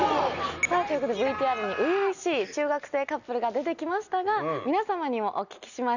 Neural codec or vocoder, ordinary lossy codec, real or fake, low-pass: none; none; real; 7.2 kHz